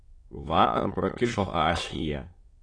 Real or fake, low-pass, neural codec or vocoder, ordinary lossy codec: fake; 9.9 kHz; autoencoder, 22.05 kHz, a latent of 192 numbers a frame, VITS, trained on many speakers; AAC, 32 kbps